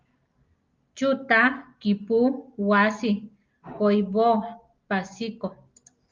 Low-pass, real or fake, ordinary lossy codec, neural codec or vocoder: 7.2 kHz; real; Opus, 24 kbps; none